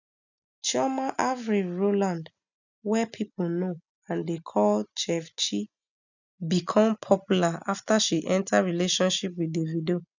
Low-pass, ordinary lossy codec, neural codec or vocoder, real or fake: 7.2 kHz; none; none; real